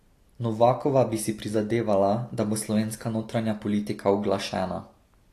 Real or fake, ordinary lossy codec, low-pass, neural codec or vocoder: real; AAC, 48 kbps; 14.4 kHz; none